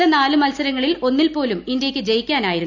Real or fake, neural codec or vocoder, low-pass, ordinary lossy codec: real; none; 7.2 kHz; none